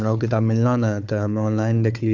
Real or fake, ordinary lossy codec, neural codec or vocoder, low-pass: fake; none; codec, 16 kHz, 4 kbps, FunCodec, trained on Chinese and English, 50 frames a second; 7.2 kHz